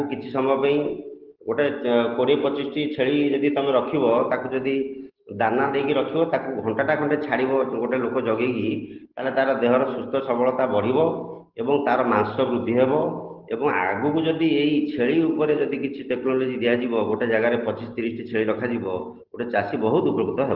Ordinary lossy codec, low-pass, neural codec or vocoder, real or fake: Opus, 16 kbps; 5.4 kHz; none; real